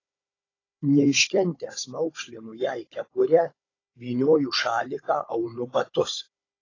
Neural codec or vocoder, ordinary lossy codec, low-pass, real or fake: codec, 16 kHz, 16 kbps, FunCodec, trained on Chinese and English, 50 frames a second; AAC, 32 kbps; 7.2 kHz; fake